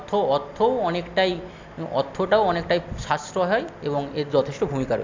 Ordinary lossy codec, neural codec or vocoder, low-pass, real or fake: MP3, 64 kbps; none; 7.2 kHz; real